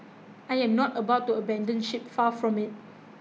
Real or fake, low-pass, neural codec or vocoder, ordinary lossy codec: real; none; none; none